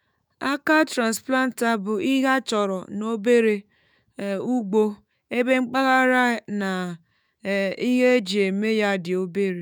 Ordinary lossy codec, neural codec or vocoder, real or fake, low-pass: none; autoencoder, 48 kHz, 128 numbers a frame, DAC-VAE, trained on Japanese speech; fake; none